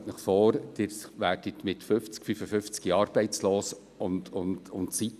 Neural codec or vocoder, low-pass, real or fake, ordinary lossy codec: none; 14.4 kHz; real; none